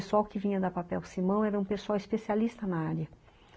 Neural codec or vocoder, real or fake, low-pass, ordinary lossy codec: none; real; none; none